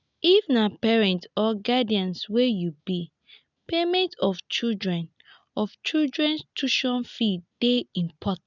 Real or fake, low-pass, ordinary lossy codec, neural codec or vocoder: real; 7.2 kHz; none; none